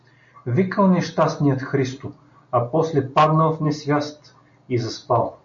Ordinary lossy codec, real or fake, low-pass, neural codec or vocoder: MP3, 64 kbps; real; 7.2 kHz; none